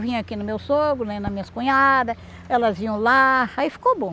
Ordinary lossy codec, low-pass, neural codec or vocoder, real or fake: none; none; none; real